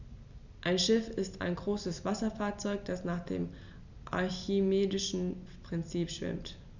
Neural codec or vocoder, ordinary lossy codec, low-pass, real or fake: none; none; 7.2 kHz; real